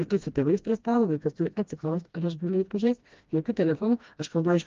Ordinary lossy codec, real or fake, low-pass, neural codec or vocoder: Opus, 32 kbps; fake; 7.2 kHz; codec, 16 kHz, 1 kbps, FreqCodec, smaller model